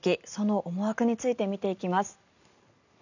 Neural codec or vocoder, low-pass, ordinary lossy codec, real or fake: none; 7.2 kHz; none; real